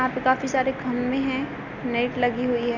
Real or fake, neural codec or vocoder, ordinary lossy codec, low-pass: real; none; none; 7.2 kHz